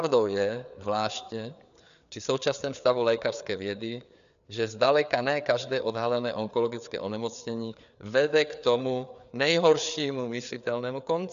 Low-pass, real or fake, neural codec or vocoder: 7.2 kHz; fake; codec, 16 kHz, 4 kbps, FreqCodec, larger model